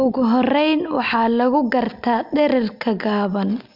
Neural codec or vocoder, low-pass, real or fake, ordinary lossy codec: none; 5.4 kHz; real; MP3, 48 kbps